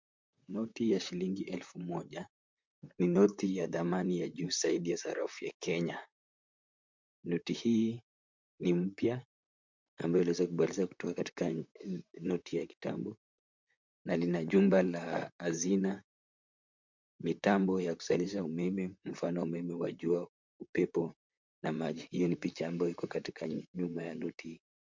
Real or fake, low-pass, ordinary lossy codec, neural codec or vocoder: fake; 7.2 kHz; MP3, 64 kbps; vocoder, 44.1 kHz, 128 mel bands, Pupu-Vocoder